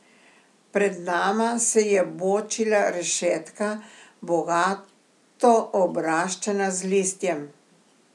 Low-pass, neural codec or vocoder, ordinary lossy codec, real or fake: none; none; none; real